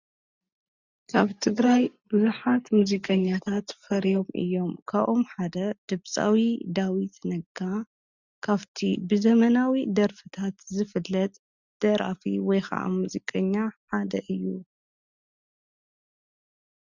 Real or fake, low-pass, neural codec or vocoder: real; 7.2 kHz; none